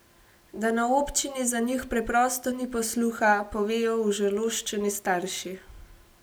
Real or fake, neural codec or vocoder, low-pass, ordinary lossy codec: real; none; none; none